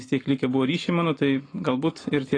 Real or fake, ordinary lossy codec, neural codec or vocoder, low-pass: real; AAC, 32 kbps; none; 9.9 kHz